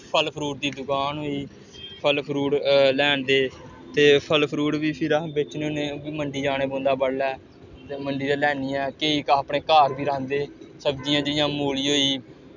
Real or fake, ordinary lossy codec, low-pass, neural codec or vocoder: real; none; 7.2 kHz; none